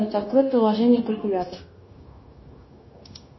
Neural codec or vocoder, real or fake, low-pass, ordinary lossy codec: codec, 16 kHz, 0.9 kbps, LongCat-Audio-Codec; fake; 7.2 kHz; MP3, 24 kbps